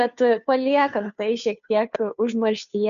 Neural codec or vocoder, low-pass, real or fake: codec, 16 kHz, 2 kbps, FunCodec, trained on Chinese and English, 25 frames a second; 7.2 kHz; fake